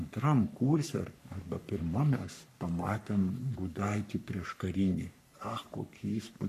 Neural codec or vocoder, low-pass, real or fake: codec, 44.1 kHz, 3.4 kbps, Pupu-Codec; 14.4 kHz; fake